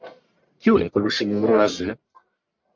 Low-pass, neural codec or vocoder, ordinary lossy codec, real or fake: 7.2 kHz; codec, 44.1 kHz, 1.7 kbps, Pupu-Codec; MP3, 64 kbps; fake